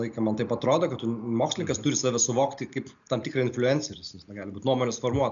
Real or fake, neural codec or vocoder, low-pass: real; none; 7.2 kHz